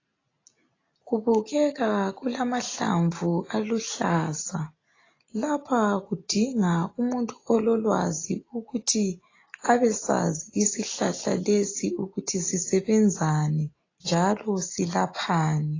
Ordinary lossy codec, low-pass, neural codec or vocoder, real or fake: AAC, 32 kbps; 7.2 kHz; none; real